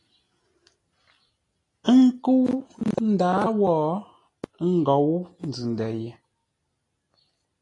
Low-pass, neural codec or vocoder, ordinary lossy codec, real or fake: 10.8 kHz; none; AAC, 32 kbps; real